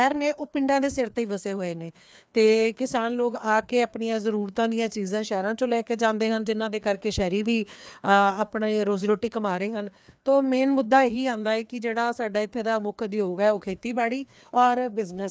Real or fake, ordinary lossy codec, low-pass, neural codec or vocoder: fake; none; none; codec, 16 kHz, 2 kbps, FreqCodec, larger model